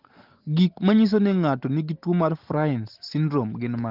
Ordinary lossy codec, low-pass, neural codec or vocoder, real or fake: Opus, 16 kbps; 5.4 kHz; none; real